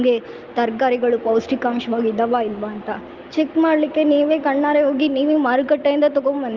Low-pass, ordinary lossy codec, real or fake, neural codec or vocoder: 7.2 kHz; Opus, 24 kbps; real; none